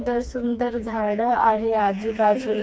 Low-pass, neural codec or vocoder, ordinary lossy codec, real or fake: none; codec, 16 kHz, 2 kbps, FreqCodec, smaller model; none; fake